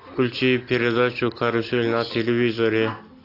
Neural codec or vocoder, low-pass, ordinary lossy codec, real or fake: none; 5.4 kHz; AAC, 32 kbps; real